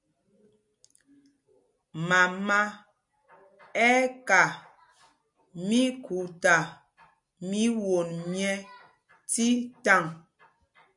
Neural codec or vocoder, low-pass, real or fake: none; 10.8 kHz; real